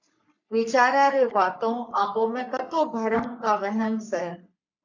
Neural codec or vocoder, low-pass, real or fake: codec, 44.1 kHz, 3.4 kbps, Pupu-Codec; 7.2 kHz; fake